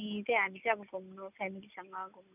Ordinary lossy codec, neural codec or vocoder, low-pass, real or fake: none; none; 3.6 kHz; real